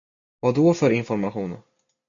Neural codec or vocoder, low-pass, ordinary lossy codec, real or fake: none; 7.2 kHz; MP3, 96 kbps; real